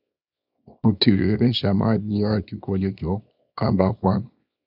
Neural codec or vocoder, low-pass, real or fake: codec, 24 kHz, 0.9 kbps, WavTokenizer, small release; 5.4 kHz; fake